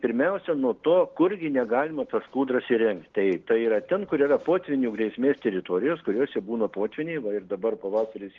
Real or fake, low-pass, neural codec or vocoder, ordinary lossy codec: real; 7.2 kHz; none; Opus, 16 kbps